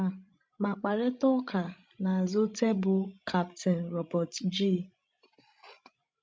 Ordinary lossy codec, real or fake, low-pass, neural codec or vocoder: none; fake; none; codec, 16 kHz, 16 kbps, FreqCodec, larger model